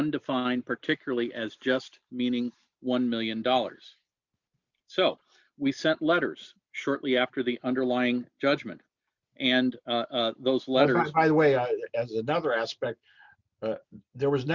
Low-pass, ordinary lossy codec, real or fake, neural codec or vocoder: 7.2 kHz; Opus, 64 kbps; real; none